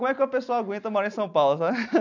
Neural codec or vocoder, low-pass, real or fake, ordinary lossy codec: none; 7.2 kHz; real; none